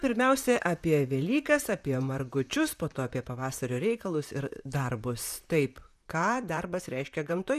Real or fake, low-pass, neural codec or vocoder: fake; 14.4 kHz; vocoder, 44.1 kHz, 128 mel bands, Pupu-Vocoder